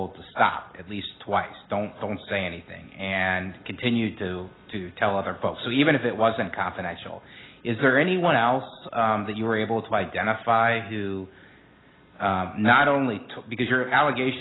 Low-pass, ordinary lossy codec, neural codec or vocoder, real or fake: 7.2 kHz; AAC, 16 kbps; none; real